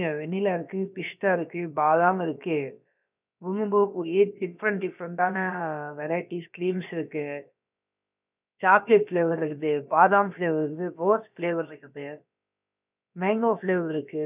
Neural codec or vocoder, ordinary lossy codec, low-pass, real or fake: codec, 16 kHz, about 1 kbps, DyCAST, with the encoder's durations; none; 3.6 kHz; fake